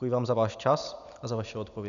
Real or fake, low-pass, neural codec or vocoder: real; 7.2 kHz; none